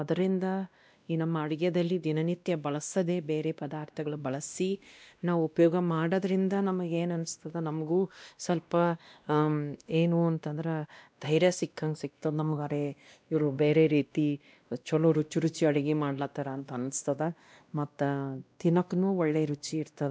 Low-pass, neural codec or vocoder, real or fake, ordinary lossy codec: none; codec, 16 kHz, 1 kbps, X-Codec, WavLM features, trained on Multilingual LibriSpeech; fake; none